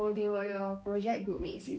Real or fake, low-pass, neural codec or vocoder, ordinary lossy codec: fake; none; codec, 16 kHz, 1 kbps, X-Codec, HuBERT features, trained on balanced general audio; none